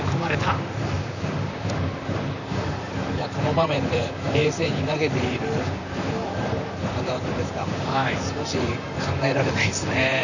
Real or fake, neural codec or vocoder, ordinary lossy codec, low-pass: fake; vocoder, 44.1 kHz, 128 mel bands, Pupu-Vocoder; none; 7.2 kHz